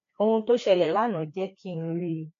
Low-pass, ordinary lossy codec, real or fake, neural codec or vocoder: 7.2 kHz; MP3, 48 kbps; fake; codec, 16 kHz, 2 kbps, FreqCodec, larger model